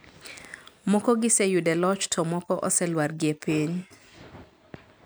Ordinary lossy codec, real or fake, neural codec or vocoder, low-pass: none; fake; vocoder, 44.1 kHz, 128 mel bands every 256 samples, BigVGAN v2; none